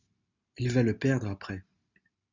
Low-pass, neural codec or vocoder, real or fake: 7.2 kHz; none; real